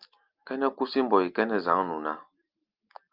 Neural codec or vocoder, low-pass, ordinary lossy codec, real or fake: none; 5.4 kHz; Opus, 24 kbps; real